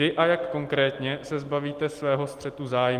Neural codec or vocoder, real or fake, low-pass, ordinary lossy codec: none; real; 14.4 kHz; Opus, 24 kbps